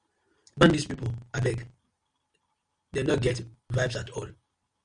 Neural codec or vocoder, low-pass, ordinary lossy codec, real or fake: none; 9.9 kHz; Opus, 64 kbps; real